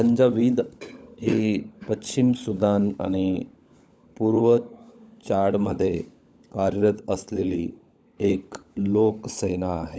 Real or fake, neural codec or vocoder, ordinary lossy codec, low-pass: fake; codec, 16 kHz, 16 kbps, FunCodec, trained on LibriTTS, 50 frames a second; none; none